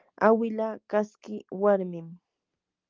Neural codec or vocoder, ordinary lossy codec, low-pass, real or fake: none; Opus, 24 kbps; 7.2 kHz; real